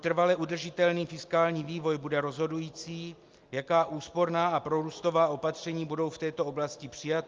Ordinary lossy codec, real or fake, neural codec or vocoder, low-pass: Opus, 32 kbps; real; none; 7.2 kHz